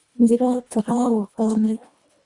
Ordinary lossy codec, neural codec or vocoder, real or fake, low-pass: Opus, 64 kbps; codec, 24 kHz, 1.5 kbps, HILCodec; fake; 10.8 kHz